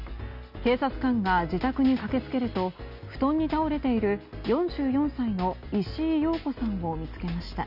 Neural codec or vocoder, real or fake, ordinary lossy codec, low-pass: none; real; none; 5.4 kHz